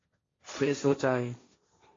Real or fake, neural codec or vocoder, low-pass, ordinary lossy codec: fake; codec, 16 kHz, 1.1 kbps, Voila-Tokenizer; 7.2 kHz; AAC, 32 kbps